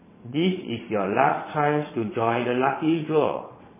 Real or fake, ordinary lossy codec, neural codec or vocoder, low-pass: fake; MP3, 16 kbps; vocoder, 22.05 kHz, 80 mel bands, WaveNeXt; 3.6 kHz